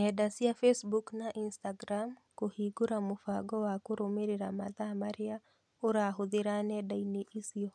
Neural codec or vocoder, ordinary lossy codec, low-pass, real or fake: none; none; none; real